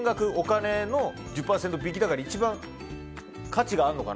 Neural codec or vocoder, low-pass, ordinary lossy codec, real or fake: none; none; none; real